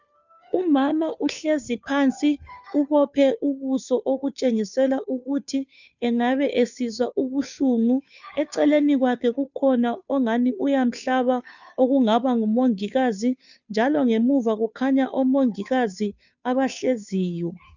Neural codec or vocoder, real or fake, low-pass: codec, 16 kHz, 2 kbps, FunCodec, trained on Chinese and English, 25 frames a second; fake; 7.2 kHz